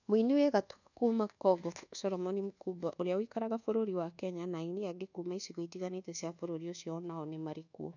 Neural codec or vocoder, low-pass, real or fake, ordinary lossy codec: codec, 24 kHz, 1.2 kbps, DualCodec; 7.2 kHz; fake; none